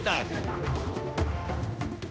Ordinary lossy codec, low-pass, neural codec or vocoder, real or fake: none; none; codec, 16 kHz, 1 kbps, X-Codec, HuBERT features, trained on general audio; fake